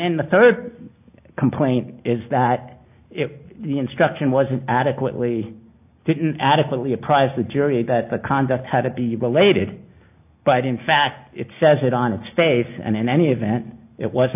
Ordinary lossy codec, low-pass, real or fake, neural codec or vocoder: AAC, 32 kbps; 3.6 kHz; real; none